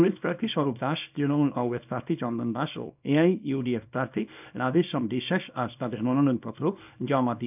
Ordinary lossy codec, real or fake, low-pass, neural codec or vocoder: none; fake; 3.6 kHz; codec, 24 kHz, 0.9 kbps, WavTokenizer, small release